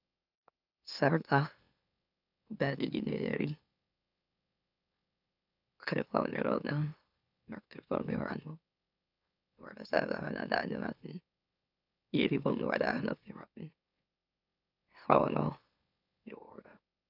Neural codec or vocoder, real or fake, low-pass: autoencoder, 44.1 kHz, a latent of 192 numbers a frame, MeloTTS; fake; 5.4 kHz